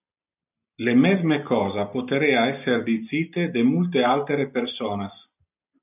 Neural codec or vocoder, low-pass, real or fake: none; 3.6 kHz; real